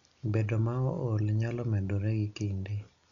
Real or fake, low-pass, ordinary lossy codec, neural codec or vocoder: real; 7.2 kHz; none; none